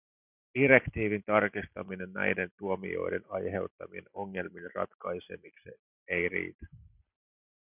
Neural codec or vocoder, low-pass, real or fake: none; 3.6 kHz; real